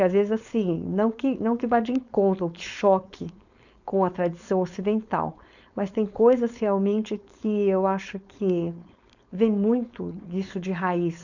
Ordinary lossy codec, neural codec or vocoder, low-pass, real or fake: none; codec, 16 kHz, 4.8 kbps, FACodec; 7.2 kHz; fake